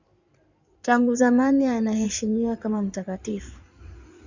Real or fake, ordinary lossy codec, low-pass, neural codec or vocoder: fake; Opus, 64 kbps; 7.2 kHz; codec, 16 kHz in and 24 kHz out, 2.2 kbps, FireRedTTS-2 codec